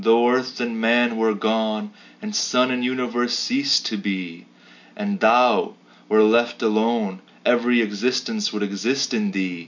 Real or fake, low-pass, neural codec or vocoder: real; 7.2 kHz; none